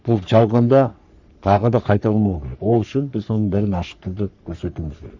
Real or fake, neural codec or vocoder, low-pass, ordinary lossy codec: fake; codec, 44.1 kHz, 3.4 kbps, Pupu-Codec; 7.2 kHz; Opus, 64 kbps